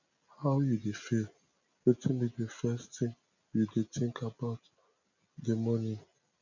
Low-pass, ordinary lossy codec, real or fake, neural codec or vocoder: 7.2 kHz; none; real; none